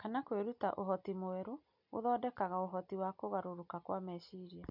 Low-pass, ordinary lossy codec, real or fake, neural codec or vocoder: 5.4 kHz; MP3, 48 kbps; real; none